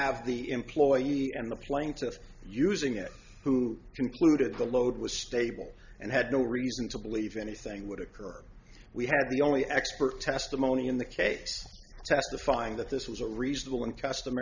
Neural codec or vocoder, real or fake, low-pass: none; real; 7.2 kHz